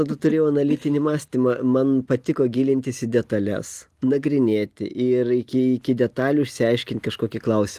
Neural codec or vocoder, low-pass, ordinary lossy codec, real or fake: none; 14.4 kHz; Opus, 24 kbps; real